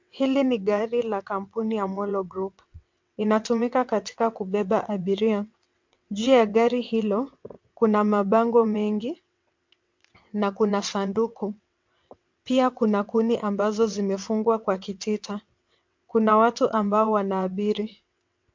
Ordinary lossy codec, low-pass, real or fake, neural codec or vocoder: MP3, 48 kbps; 7.2 kHz; fake; vocoder, 22.05 kHz, 80 mel bands, WaveNeXt